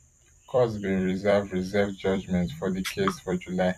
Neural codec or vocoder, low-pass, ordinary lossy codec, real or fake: vocoder, 44.1 kHz, 128 mel bands every 256 samples, BigVGAN v2; 14.4 kHz; none; fake